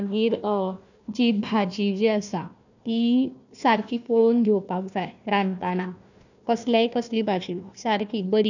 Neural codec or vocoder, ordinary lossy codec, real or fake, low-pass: codec, 16 kHz, 1 kbps, FunCodec, trained on Chinese and English, 50 frames a second; none; fake; 7.2 kHz